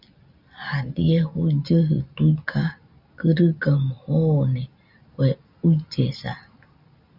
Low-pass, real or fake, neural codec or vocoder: 5.4 kHz; real; none